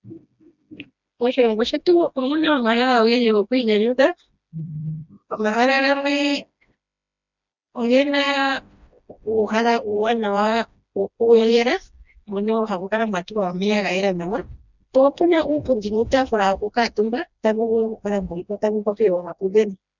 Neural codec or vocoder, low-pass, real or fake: codec, 16 kHz, 1 kbps, FreqCodec, smaller model; 7.2 kHz; fake